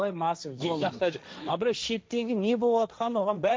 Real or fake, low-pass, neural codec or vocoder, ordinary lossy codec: fake; none; codec, 16 kHz, 1.1 kbps, Voila-Tokenizer; none